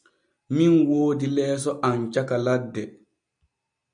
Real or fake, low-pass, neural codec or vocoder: real; 9.9 kHz; none